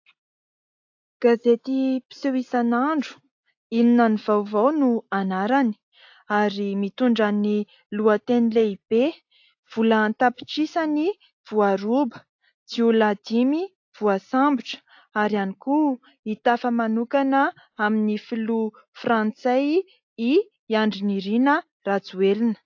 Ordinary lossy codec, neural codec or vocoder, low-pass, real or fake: AAC, 48 kbps; none; 7.2 kHz; real